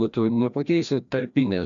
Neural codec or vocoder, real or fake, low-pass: codec, 16 kHz, 1 kbps, FreqCodec, larger model; fake; 7.2 kHz